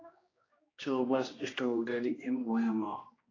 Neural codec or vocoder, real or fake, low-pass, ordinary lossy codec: codec, 16 kHz, 1 kbps, X-Codec, HuBERT features, trained on general audio; fake; 7.2 kHz; AAC, 32 kbps